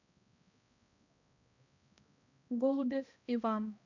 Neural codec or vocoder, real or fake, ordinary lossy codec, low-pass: codec, 16 kHz, 1 kbps, X-Codec, HuBERT features, trained on balanced general audio; fake; none; 7.2 kHz